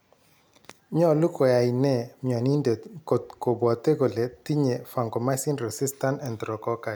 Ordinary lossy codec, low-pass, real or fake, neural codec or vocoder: none; none; real; none